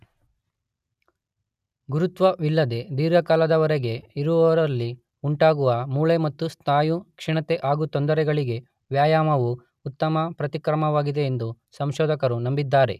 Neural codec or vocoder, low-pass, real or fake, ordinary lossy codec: none; 14.4 kHz; real; none